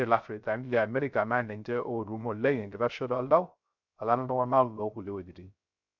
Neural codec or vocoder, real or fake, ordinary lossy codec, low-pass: codec, 16 kHz, 0.3 kbps, FocalCodec; fake; none; 7.2 kHz